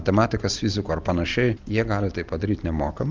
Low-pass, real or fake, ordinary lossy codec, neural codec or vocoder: 7.2 kHz; real; Opus, 24 kbps; none